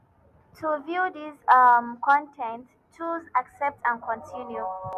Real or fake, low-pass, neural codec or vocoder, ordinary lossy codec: real; 14.4 kHz; none; none